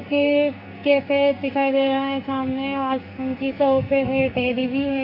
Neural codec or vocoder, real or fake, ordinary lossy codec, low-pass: codec, 44.1 kHz, 2.6 kbps, SNAC; fake; AAC, 32 kbps; 5.4 kHz